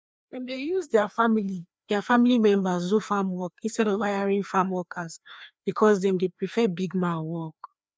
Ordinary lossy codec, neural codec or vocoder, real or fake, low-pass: none; codec, 16 kHz, 2 kbps, FreqCodec, larger model; fake; none